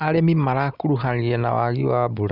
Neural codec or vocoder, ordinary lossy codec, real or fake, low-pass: none; none; real; 5.4 kHz